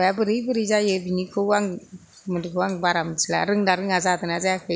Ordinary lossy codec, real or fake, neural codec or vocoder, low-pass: none; real; none; none